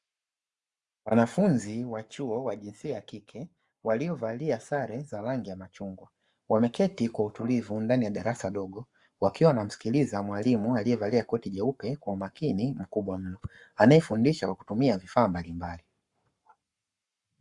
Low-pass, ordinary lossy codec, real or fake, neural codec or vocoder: 10.8 kHz; Opus, 64 kbps; fake; codec, 44.1 kHz, 7.8 kbps, Pupu-Codec